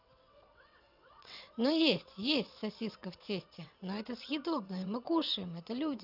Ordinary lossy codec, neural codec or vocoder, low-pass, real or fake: none; vocoder, 22.05 kHz, 80 mel bands, WaveNeXt; 5.4 kHz; fake